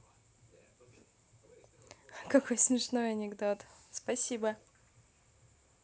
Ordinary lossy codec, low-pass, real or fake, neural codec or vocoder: none; none; real; none